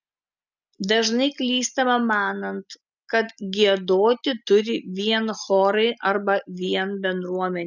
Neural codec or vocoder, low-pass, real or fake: none; 7.2 kHz; real